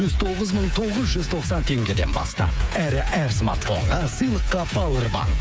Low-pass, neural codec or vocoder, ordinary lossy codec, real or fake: none; codec, 16 kHz, 8 kbps, FreqCodec, smaller model; none; fake